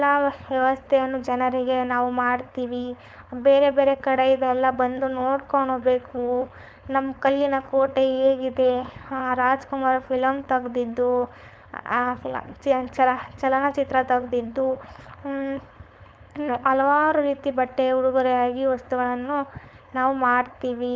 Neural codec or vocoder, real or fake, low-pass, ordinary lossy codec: codec, 16 kHz, 4.8 kbps, FACodec; fake; none; none